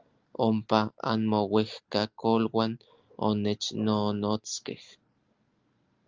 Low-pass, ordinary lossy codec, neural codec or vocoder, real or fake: 7.2 kHz; Opus, 32 kbps; none; real